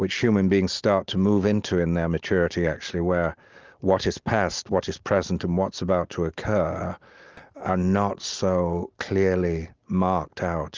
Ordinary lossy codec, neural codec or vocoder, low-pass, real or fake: Opus, 24 kbps; none; 7.2 kHz; real